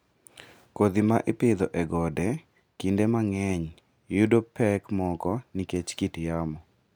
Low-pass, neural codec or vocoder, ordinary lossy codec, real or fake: none; none; none; real